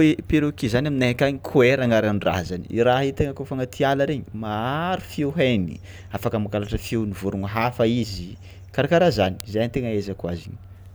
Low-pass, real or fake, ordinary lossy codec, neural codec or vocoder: none; real; none; none